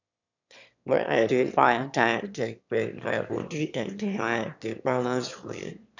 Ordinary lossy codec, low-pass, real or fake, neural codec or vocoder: none; 7.2 kHz; fake; autoencoder, 22.05 kHz, a latent of 192 numbers a frame, VITS, trained on one speaker